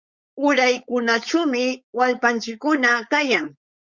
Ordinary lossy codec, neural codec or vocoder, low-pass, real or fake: Opus, 64 kbps; codec, 16 kHz, 4.8 kbps, FACodec; 7.2 kHz; fake